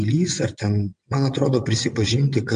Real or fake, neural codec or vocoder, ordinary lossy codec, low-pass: fake; vocoder, 22.05 kHz, 80 mel bands, WaveNeXt; MP3, 64 kbps; 9.9 kHz